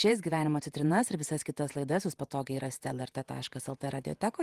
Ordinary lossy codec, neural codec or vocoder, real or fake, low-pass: Opus, 24 kbps; vocoder, 48 kHz, 128 mel bands, Vocos; fake; 14.4 kHz